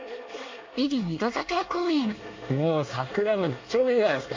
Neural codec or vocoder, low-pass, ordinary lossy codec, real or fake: codec, 24 kHz, 1 kbps, SNAC; 7.2 kHz; MP3, 48 kbps; fake